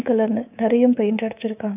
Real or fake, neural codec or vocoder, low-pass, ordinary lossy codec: fake; codec, 24 kHz, 3.1 kbps, DualCodec; 3.6 kHz; none